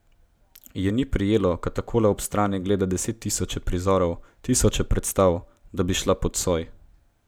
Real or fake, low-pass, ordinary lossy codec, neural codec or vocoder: real; none; none; none